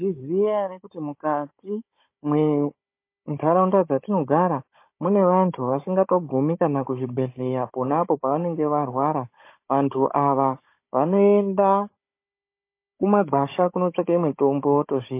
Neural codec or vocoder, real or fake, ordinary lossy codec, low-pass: codec, 16 kHz, 16 kbps, FunCodec, trained on Chinese and English, 50 frames a second; fake; MP3, 24 kbps; 3.6 kHz